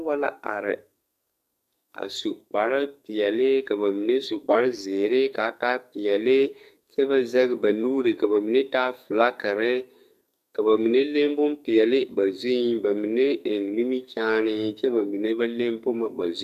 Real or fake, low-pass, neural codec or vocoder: fake; 14.4 kHz; codec, 44.1 kHz, 2.6 kbps, SNAC